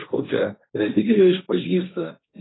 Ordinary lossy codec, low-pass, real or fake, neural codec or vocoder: AAC, 16 kbps; 7.2 kHz; fake; codec, 16 kHz, 1.1 kbps, Voila-Tokenizer